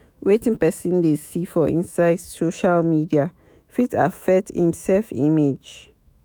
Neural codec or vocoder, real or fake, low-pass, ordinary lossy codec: autoencoder, 48 kHz, 128 numbers a frame, DAC-VAE, trained on Japanese speech; fake; none; none